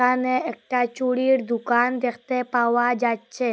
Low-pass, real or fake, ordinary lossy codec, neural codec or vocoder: none; real; none; none